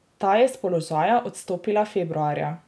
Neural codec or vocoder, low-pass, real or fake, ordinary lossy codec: none; none; real; none